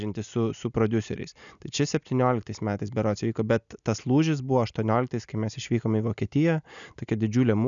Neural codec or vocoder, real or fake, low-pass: none; real; 7.2 kHz